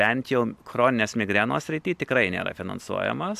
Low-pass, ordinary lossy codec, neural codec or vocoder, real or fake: 14.4 kHz; AAC, 96 kbps; none; real